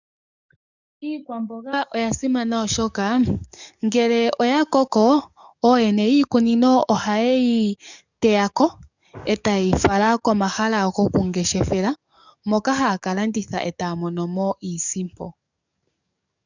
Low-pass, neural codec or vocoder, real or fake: 7.2 kHz; codec, 44.1 kHz, 7.8 kbps, DAC; fake